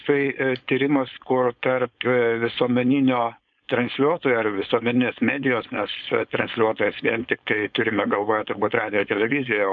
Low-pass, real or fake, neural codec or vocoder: 7.2 kHz; fake; codec, 16 kHz, 4.8 kbps, FACodec